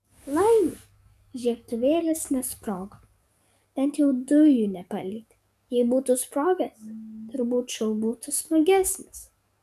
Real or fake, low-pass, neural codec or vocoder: fake; 14.4 kHz; codec, 44.1 kHz, 7.8 kbps, DAC